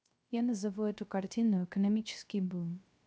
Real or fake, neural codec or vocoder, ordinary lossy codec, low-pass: fake; codec, 16 kHz, 0.3 kbps, FocalCodec; none; none